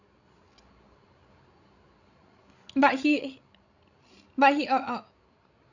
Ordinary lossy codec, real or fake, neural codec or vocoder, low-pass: none; fake; codec, 16 kHz, 16 kbps, FreqCodec, larger model; 7.2 kHz